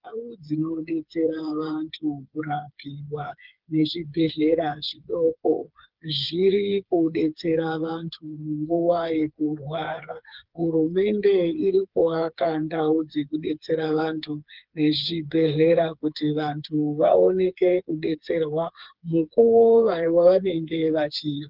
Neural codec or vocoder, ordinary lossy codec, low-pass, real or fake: codec, 16 kHz, 4 kbps, FreqCodec, smaller model; Opus, 32 kbps; 5.4 kHz; fake